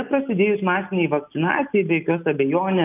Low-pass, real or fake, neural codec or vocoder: 3.6 kHz; real; none